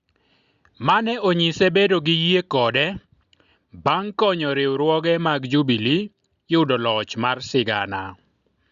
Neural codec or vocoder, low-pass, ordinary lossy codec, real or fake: none; 7.2 kHz; Opus, 64 kbps; real